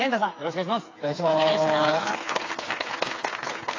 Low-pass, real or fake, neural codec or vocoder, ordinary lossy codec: 7.2 kHz; fake; codec, 16 kHz, 4 kbps, FreqCodec, smaller model; AAC, 32 kbps